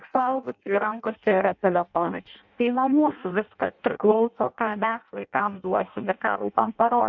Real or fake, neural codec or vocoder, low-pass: fake; codec, 16 kHz in and 24 kHz out, 0.6 kbps, FireRedTTS-2 codec; 7.2 kHz